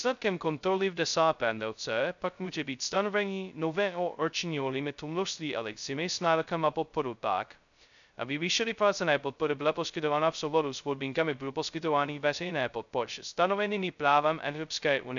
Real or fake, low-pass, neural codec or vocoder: fake; 7.2 kHz; codec, 16 kHz, 0.2 kbps, FocalCodec